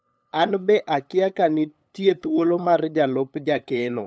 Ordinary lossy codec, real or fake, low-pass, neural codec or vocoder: none; fake; none; codec, 16 kHz, 8 kbps, FunCodec, trained on LibriTTS, 25 frames a second